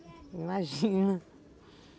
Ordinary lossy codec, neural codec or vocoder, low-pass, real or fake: none; none; none; real